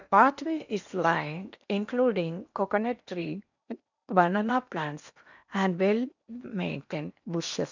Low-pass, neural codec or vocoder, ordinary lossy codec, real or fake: 7.2 kHz; codec, 16 kHz in and 24 kHz out, 0.8 kbps, FocalCodec, streaming, 65536 codes; none; fake